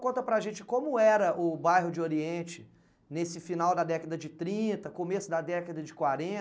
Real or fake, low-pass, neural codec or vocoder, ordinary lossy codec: real; none; none; none